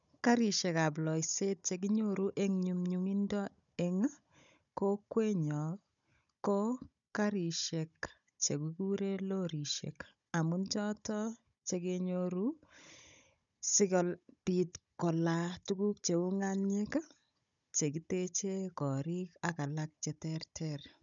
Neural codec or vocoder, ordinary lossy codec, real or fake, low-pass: codec, 16 kHz, 16 kbps, FunCodec, trained on Chinese and English, 50 frames a second; none; fake; 7.2 kHz